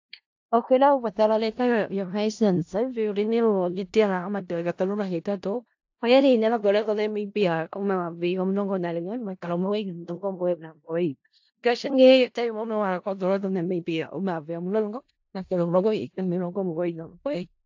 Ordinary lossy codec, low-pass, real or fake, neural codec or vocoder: AAC, 48 kbps; 7.2 kHz; fake; codec, 16 kHz in and 24 kHz out, 0.4 kbps, LongCat-Audio-Codec, four codebook decoder